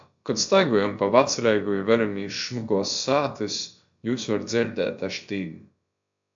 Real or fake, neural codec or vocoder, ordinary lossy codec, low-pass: fake; codec, 16 kHz, about 1 kbps, DyCAST, with the encoder's durations; AAC, 64 kbps; 7.2 kHz